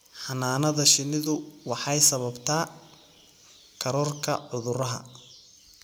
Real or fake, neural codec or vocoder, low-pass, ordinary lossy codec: real; none; none; none